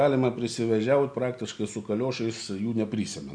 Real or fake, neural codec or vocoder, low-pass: real; none; 9.9 kHz